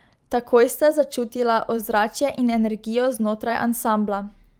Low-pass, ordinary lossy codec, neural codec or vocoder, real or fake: 19.8 kHz; Opus, 32 kbps; vocoder, 44.1 kHz, 128 mel bands every 512 samples, BigVGAN v2; fake